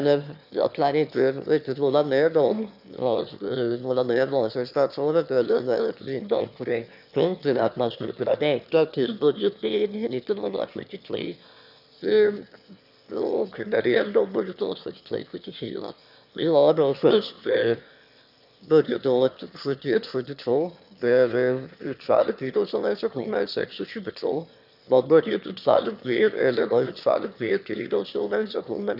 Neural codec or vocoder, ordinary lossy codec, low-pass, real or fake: autoencoder, 22.05 kHz, a latent of 192 numbers a frame, VITS, trained on one speaker; none; 5.4 kHz; fake